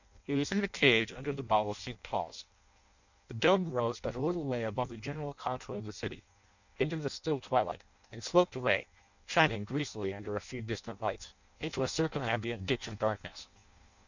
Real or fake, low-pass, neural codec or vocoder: fake; 7.2 kHz; codec, 16 kHz in and 24 kHz out, 0.6 kbps, FireRedTTS-2 codec